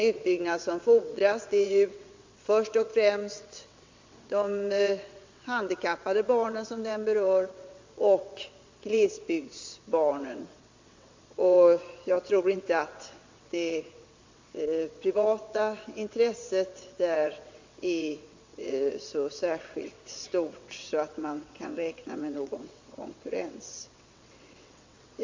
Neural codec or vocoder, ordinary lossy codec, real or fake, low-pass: vocoder, 22.05 kHz, 80 mel bands, WaveNeXt; MP3, 48 kbps; fake; 7.2 kHz